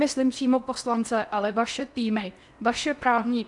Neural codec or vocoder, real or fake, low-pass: codec, 16 kHz in and 24 kHz out, 0.8 kbps, FocalCodec, streaming, 65536 codes; fake; 10.8 kHz